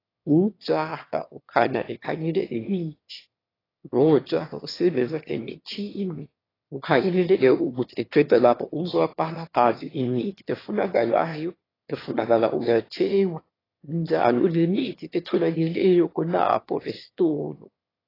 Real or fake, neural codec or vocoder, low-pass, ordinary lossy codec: fake; autoencoder, 22.05 kHz, a latent of 192 numbers a frame, VITS, trained on one speaker; 5.4 kHz; AAC, 24 kbps